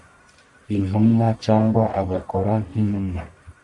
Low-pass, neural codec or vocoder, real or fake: 10.8 kHz; codec, 44.1 kHz, 1.7 kbps, Pupu-Codec; fake